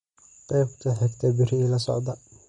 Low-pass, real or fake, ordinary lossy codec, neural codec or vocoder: 19.8 kHz; real; MP3, 48 kbps; none